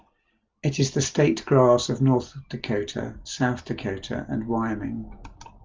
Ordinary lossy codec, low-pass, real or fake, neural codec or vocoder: Opus, 32 kbps; 7.2 kHz; real; none